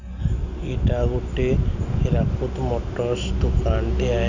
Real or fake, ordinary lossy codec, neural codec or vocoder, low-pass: real; none; none; 7.2 kHz